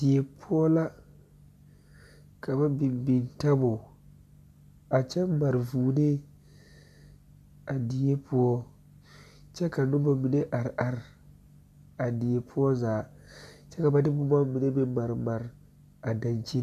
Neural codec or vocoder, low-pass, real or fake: none; 14.4 kHz; real